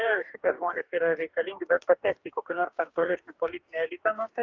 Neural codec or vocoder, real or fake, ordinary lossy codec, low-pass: codec, 44.1 kHz, 2.6 kbps, DAC; fake; Opus, 32 kbps; 7.2 kHz